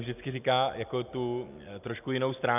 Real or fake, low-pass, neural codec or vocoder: real; 3.6 kHz; none